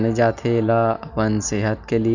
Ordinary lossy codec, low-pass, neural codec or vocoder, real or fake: none; 7.2 kHz; none; real